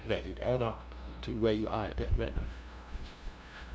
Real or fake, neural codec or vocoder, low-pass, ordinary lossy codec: fake; codec, 16 kHz, 0.5 kbps, FunCodec, trained on LibriTTS, 25 frames a second; none; none